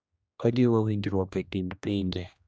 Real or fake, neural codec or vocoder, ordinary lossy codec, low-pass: fake; codec, 16 kHz, 1 kbps, X-Codec, HuBERT features, trained on general audio; none; none